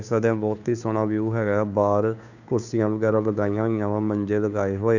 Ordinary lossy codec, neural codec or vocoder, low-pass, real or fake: none; codec, 16 kHz, 2 kbps, FunCodec, trained on Chinese and English, 25 frames a second; 7.2 kHz; fake